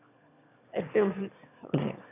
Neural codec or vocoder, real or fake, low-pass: autoencoder, 22.05 kHz, a latent of 192 numbers a frame, VITS, trained on one speaker; fake; 3.6 kHz